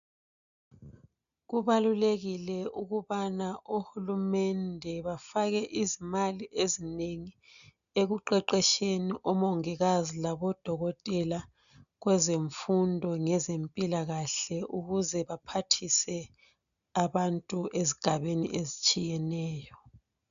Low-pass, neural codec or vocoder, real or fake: 7.2 kHz; none; real